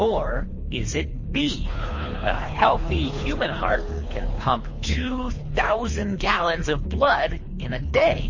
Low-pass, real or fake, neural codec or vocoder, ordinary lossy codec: 7.2 kHz; fake; codec, 24 kHz, 3 kbps, HILCodec; MP3, 32 kbps